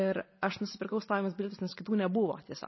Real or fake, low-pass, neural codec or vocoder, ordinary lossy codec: real; 7.2 kHz; none; MP3, 24 kbps